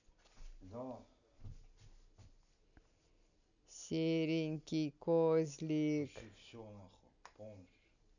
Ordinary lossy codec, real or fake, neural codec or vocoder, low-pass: none; real; none; 7.2 kHz